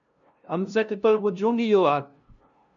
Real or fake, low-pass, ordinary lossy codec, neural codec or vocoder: fake; 7.2 kHz; MP3, 96 kbps; codec, 16 kHz, 0.5 kbps, FunCodec, trained on LibriTTS, 25 frames a second